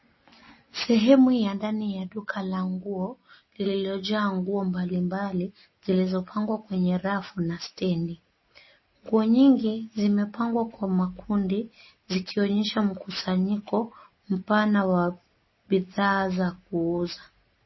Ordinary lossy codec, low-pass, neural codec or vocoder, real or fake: MP3, 24 kbps; 7.2 kHz; none; real